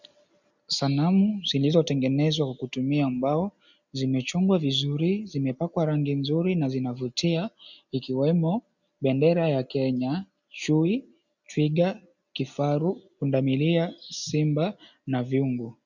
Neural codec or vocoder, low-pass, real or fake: none; 7.2 kHz; real